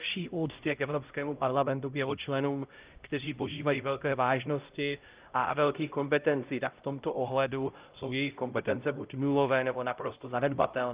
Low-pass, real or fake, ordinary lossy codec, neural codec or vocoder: 3.6 kHz; fake; Opus, 32 kbps; codec, 16 kHz, 0.5 kbps, X-Codec, HuBERT features, trained on LibriSpeech